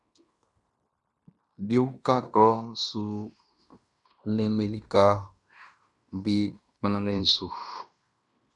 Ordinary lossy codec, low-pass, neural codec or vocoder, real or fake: MP3, 96 kbps; 10.8 kHz; codec, 16 kHz in and 24 kHz out, 0.9 kbps, LongCat-Audio-Codec, fine tuned four codebook decoder; fake